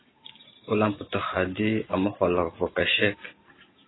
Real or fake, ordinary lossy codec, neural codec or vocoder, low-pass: real; AAC, 16 kbps; none; 7.2 kHz